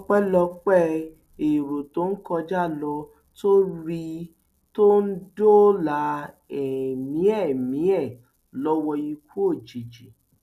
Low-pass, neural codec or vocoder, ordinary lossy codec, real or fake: 14.4 kHz; none; none; real